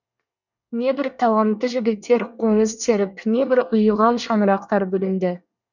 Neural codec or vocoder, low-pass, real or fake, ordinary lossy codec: codec, 24 kHz, 1 kbps, SNAC; 7.2 kHz; fake; none